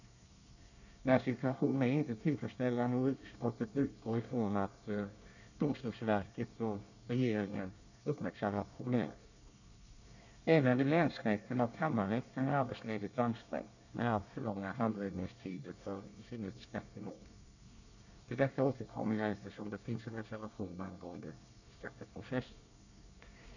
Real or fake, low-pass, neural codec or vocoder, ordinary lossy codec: fake; 7.2 kHz; codec, 24 kHz, 1 kbps, SNAC; none